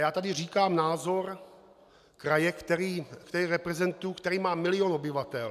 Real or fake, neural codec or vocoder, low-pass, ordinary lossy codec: real; none; 14.4 kHz; MP3, 96 kbps